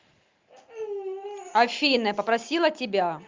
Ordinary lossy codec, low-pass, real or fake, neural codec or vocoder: Opus, 32 kbps; 7.2 kHz; real; none